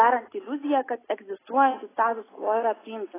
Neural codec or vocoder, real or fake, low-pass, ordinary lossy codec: none; real; 3.6 kHz; AAC, 16 kbps